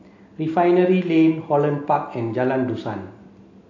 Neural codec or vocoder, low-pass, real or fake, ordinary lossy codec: none; 7.2 kHz; real; AAC, 48 kbps